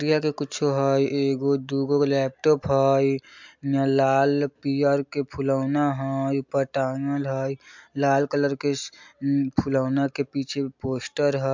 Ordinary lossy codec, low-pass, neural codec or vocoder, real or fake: MP3, 64 kbps; 7.2 kHz; none; real